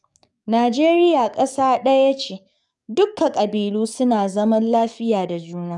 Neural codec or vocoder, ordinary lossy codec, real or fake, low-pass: codec, 44.1 kHz, 7.8 kbps, DAC; none; fake; 10.8 kHz